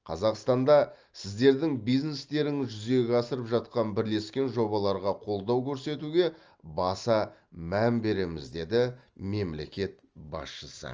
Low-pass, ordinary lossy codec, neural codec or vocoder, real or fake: 7.2 kHz; Opus, 24 kbps; none; real